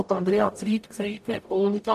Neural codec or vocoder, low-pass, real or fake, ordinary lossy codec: codec, 44.1 kHz, 0.9 kbps, DAC; 14.4 kHz; fake; none